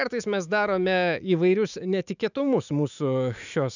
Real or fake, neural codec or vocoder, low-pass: fake; autoencoder, 48 kHz, 128 numbers a frame, DAC-VAE, trained on Japanese speech; 7.2 kHz